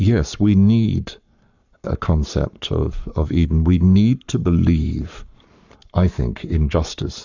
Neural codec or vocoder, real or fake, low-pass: codec, 44.1 kHz, 7.8 kbps, Pupu-Codec; fake; 7.2 kHz